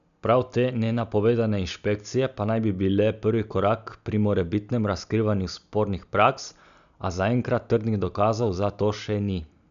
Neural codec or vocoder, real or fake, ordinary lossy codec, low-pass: none; real; none; 7.2 kHz